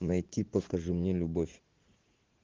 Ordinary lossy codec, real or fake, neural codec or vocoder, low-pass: Opus, 16 kbps; fake; vocoder, 44.1 kHz, 80 mel bands, Vocos; 7.2 kHz